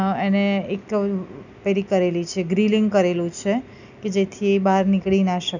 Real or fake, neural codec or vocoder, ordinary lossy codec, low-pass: real; none; none; 7.2 kHz